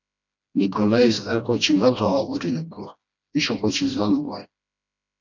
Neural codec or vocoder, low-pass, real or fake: codec, 16 kHz, 1 kbps, FreqCodec, smaller model; 7.2 kHz; fake